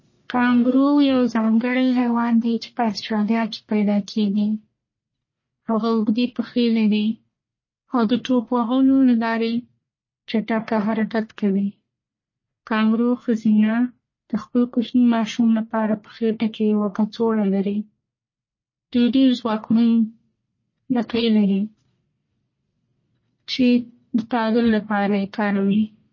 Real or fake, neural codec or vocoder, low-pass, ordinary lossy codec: fake; codec, 44.1 kHz, 1.7 kbps, Pupu-Codec; 7.2 kHz; MP3, 32 kbps